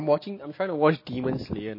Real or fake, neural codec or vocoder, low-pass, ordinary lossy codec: real; none; 5.4 kHz; MP3, 24 kbps